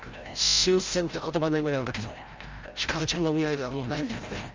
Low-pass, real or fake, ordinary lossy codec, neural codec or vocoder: 7.2 kHz; fake; Opus, 32 kbps; codec, 16 kHz, 0.5 kbps, FreqCodec, larger model